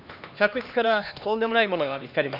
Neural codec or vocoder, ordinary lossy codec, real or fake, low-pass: codec, 16 kHz, 2 kbps, X-Codec, HuBERT features, trained on LibriSpeech; none; fake; 5.4 kHz